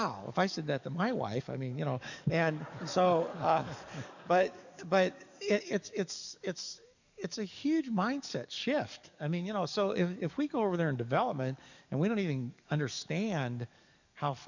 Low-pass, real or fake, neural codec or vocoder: 7.2 kHz; fake; codec, 44.1 kHz, 7.8 kbps, DAC